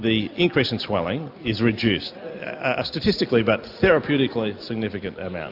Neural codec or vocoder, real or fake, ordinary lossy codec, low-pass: none; real; AAC, 48 kbps; 5.4 kHz